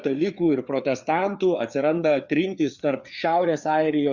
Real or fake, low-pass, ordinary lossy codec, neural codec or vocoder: fake; 7.2 kHz; Opus, 64 kbps; codec, 16 kHz, 4 kbps, FreqCodec, larger model